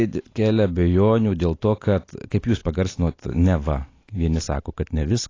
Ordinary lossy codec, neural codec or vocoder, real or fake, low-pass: AAC, 32 kbps; none; real; 7.2 kHz